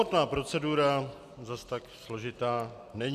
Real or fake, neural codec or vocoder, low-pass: real; none; 14.4 kHz